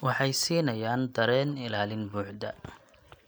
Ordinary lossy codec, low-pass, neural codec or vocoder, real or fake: none; none; none; real